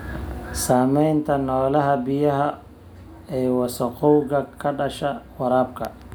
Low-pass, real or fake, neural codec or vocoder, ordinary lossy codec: none; real; none; none